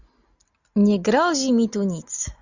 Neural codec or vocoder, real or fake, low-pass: none; real; 7.2 kHz